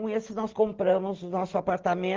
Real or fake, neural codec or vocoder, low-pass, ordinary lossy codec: real; none; 7.2 kHz; Opus, 16 kbps